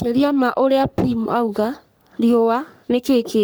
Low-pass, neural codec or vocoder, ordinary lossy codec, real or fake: none; codec, 44.1 kHz, 3.4 kbps, Pupu-Codec; none; fake